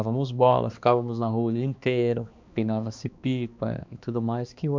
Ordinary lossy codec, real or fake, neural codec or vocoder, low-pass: MP3, 64 kbps; fake; codec, 16 kHz, 2 kbps, X-Codec, HuBERT features, trained on balanced general audio; 7.2 kHz